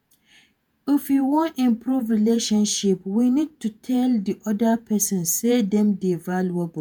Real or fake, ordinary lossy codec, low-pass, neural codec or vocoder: fake; none; none; vocoder, 48 kHz, 128 mel bands, Vocos